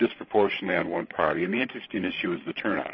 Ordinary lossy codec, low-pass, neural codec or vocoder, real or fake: MP3, 24 kbps; 7.2 kHz; codec, 16 kHz, 16 kbps, FreqCodec, larger model; fake